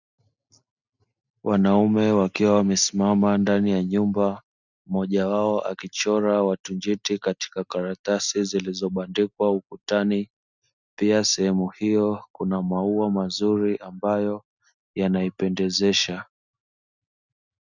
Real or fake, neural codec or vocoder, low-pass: real; none; 7.2 kHz